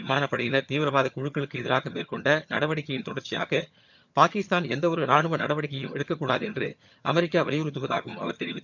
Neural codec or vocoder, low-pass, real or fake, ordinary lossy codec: vocoder, 22.05 kHz, 80 mel bands, HiFi-GAN; 7.2 kHz; fake; none